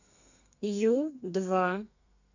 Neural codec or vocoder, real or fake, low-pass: codec, 32 kHz, 1.9 kbps, SNAC; fake; 7.2 kHz